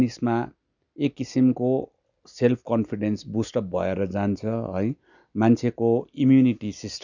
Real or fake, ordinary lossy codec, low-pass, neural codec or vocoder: real; none; 7.2 kHz; none